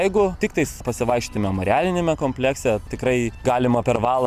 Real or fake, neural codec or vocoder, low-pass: real; none; 14.4 kHz